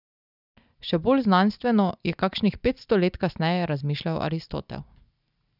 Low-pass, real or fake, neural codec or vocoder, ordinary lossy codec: 5.4 kHz; real; none; none